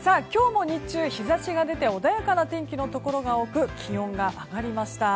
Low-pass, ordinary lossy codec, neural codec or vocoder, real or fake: none; none; none; real